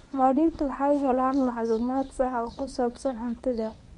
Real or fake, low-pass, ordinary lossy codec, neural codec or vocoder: fake; 10.8 kHz; none; codec, 24 kHz, 0.9 kbps, WavTokenizer, medium speech release version 1